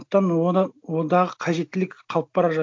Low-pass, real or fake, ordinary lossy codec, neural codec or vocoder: none; real; none; none